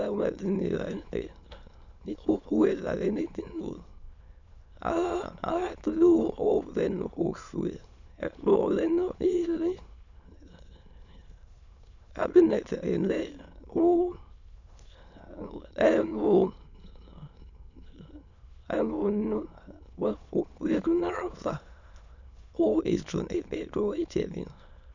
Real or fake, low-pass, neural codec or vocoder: fake; 7.2 kHz; autoencoder, 22.05 kHz, a latent of 192 numbers a frame, VITS, trained on many speakers